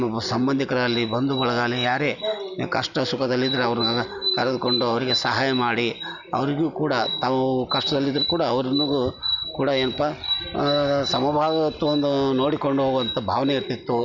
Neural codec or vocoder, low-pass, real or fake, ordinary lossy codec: none; 7.2 kHz; real; none